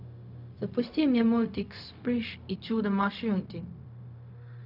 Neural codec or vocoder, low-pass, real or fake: codec, 16 kHz, 0.4 kbps, LongCat-Audio-Codec; 5.4 kHz; fake